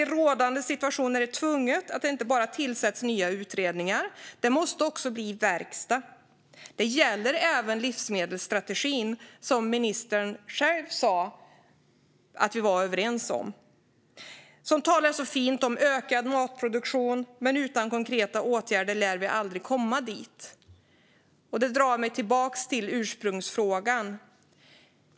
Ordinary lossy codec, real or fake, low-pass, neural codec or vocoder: none; real; none; none